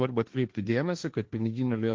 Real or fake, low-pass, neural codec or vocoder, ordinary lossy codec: fake; 7.2 kHz; codec, 16 kHz, 1.1 kbps, Voila-Tokenizer; Opus, 32 kbps